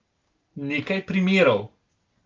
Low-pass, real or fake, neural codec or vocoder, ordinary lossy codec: 7.2 kHz; real; none; Opus, 32 kbps